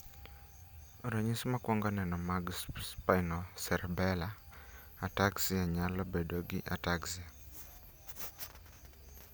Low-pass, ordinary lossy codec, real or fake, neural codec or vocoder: none; none; real; none